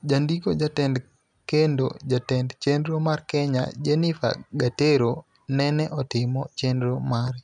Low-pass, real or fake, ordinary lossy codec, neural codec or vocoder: 10.8 kHz; real; none; none